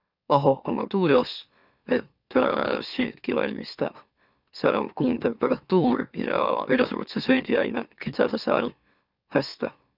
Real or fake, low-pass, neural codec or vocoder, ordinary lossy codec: fake; 5.4 kHz; autoencoder, 44.1 kHz, a latent of 192 numbers a frame, MeloTTS; none